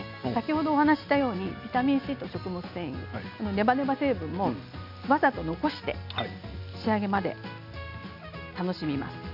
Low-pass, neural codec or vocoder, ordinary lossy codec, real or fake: 5.4 kHz; none; none; real